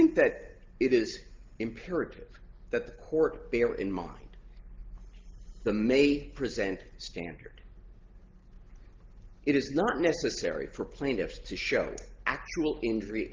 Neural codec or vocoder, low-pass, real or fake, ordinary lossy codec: none; 7.2 kHz; real; Opus, 16 kbps